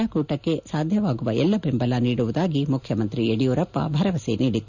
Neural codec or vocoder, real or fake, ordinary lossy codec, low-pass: none; real; none; 7.2 kHz